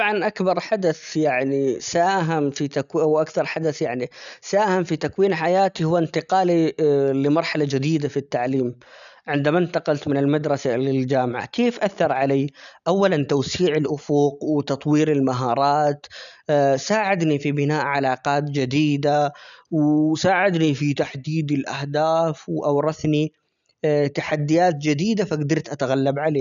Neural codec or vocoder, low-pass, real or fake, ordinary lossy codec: none; 7.2 kHz; real; none